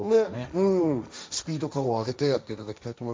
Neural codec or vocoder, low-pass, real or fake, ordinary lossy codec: codec, 16 kHz, 1.1 kbps, Voila-Tokenizer; none; fake; none